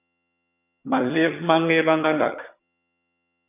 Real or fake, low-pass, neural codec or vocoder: fake; 3.6 kHz; vocoder, 22.05 kHz, 80 mel bands, HiFi-GAN